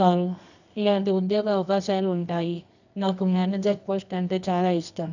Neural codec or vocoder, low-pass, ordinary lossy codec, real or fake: codec, 24 kHz, 0.9 kbps, WavTokenizer, medium music audio release; 7.2 kHz; none; fake